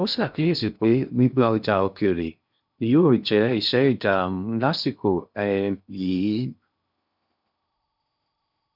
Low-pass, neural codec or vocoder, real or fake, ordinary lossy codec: 5.4 kHz; codec, 16 kHz in and 24 kHz out, 0.6 kbps, FocalCodec, streaming, 2048 codes; fake; none